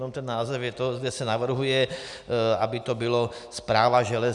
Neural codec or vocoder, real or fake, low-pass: none; real; 10.8 kHz